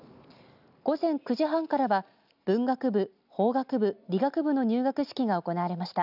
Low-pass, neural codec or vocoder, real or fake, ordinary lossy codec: 5.4 kHz; none; real; none